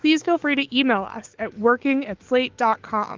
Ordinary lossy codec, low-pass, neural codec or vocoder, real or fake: Opus, 16 kbps; 7.2 kHz; autoencoder, 48 kHz, 128 numbers a frame, DAC-VAE, trained on Japanese speech; fake